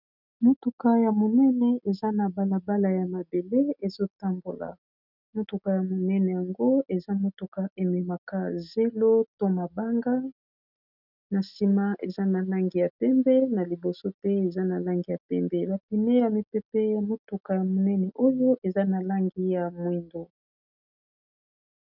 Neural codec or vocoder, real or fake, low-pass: none; real; 5.4 kHz